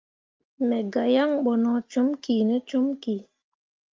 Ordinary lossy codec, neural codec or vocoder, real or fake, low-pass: Opus, 24 kbps; none; real; 7.2 kHz